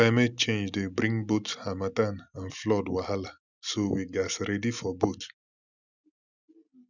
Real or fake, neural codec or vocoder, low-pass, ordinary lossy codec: real; none; 7.2 kHz; none